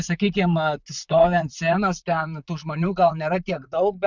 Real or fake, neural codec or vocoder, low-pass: fake; vocoder, 44.1 kHz, 128 mel bands, Pupu-Vocoder; 7.2 kHz